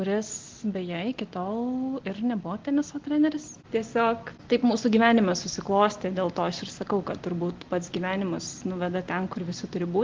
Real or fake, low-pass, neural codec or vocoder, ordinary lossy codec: real; 7.2 kHz; none; Opus, 16 kbps